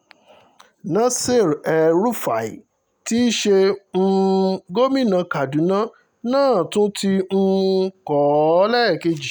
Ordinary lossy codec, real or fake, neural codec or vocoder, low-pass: none; real; none; none